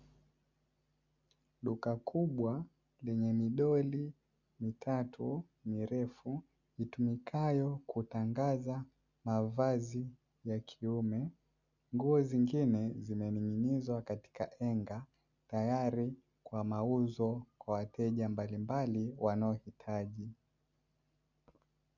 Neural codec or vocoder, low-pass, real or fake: none; 7.2 kHz; real